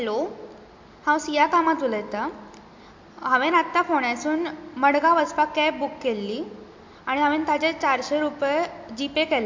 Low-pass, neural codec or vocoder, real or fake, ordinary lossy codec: 7.2 kHz; none; real; MP3, 48 kbps